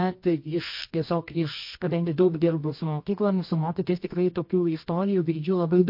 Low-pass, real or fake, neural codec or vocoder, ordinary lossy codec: 5.4 kHz; fake; codec, 24 kHz, 0.9 kbps, WavTokenizer, medium music audio release; MP3, 32 kbps